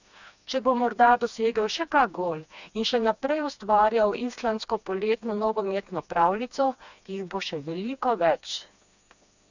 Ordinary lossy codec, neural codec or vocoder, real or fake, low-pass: none; codec, 16 kHz, 2 kbps, FreqCodec, smaller model; fake; 7.2 kHz